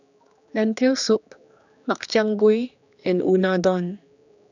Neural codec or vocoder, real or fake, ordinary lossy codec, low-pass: codec, 16 kHz, 2 kbps, X-Codec, HuBERT features, trained on general audio; fake; none; 7.2 kHz